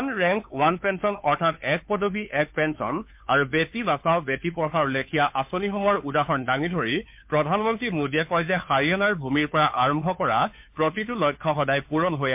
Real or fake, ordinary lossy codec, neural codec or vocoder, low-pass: fake; MP3, 32 kbps; codec, 16 kHz, 2 kbps, FunCodec, trained on Chinese and English, 25 frames a second; 3.6 kHz